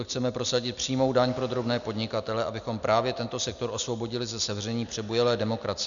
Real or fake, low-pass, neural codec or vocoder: real; 7.2 kHz; none